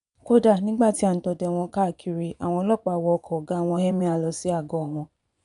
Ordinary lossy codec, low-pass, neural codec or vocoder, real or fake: none; 10.8 kHz; vocoder, 24 kHz, 100 mel bands, Vocos; fake